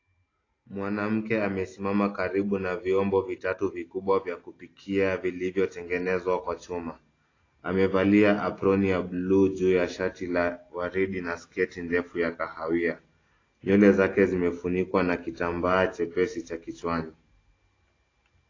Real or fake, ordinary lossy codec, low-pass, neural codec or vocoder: real; AAC, 32 kbps; 7.2 kHz; none